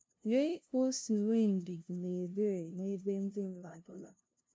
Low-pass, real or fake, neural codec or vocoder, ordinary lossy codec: none; fake; codec, 16 kHz, 0.5 kbps, FunCodec, trained on LibriTTS, 25 frames a second; none